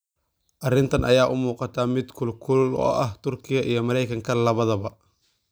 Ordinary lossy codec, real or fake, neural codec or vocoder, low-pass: none; real; none; none